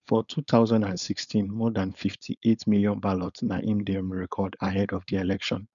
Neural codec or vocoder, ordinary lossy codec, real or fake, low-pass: codec, 16 kHz, 4.8 kbps, FACodec; none; fake; 7.2 kHz